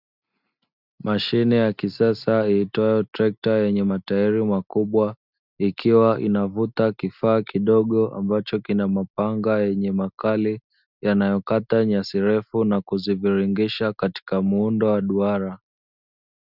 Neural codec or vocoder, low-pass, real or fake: none; 5.4 kHz; real